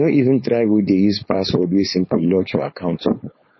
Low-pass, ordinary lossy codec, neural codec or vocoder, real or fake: 7.2 kHz; MP3, 24 kbps; codec, 16 kHz, 4.8 kbps, FACodec; fake